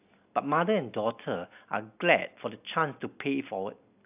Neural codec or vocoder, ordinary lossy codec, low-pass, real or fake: none; AAC, 32 kbps; 3.6 kHz; real